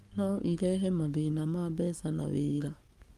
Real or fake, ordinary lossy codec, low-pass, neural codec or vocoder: fake; Opus, 32 kbps; 14.4 kHz; codec, 44.1 kHz, 7.8 kbps, Pupu-Codec